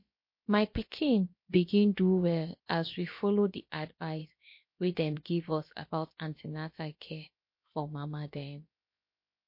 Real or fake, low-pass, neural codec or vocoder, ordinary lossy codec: fake; 5.4 kHz; codec, 16 kHz, about 1 kbps, DyCAST, with the encoder's durations; MP3, 32 kbps